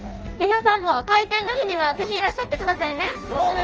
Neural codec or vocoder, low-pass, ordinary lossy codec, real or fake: codec, 16 kHz in and 24 kHz out, 0.6 kbps, FireRedTTS-2 codec; 7.2 kHz; Opus, 24 kbps; fake